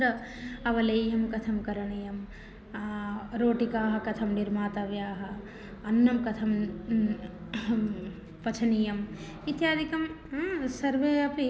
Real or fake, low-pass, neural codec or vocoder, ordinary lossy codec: real; none; none; none